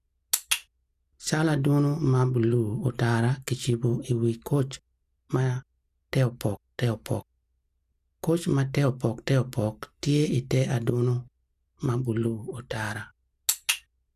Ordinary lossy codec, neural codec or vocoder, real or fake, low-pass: none; none; real; 14.4 kHz